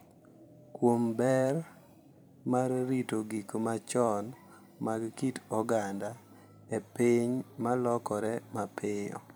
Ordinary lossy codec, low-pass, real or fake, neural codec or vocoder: none; none; fake; vocoder, 44.1 kHz, 128 mel bands every 256 samples, BigVGAN v2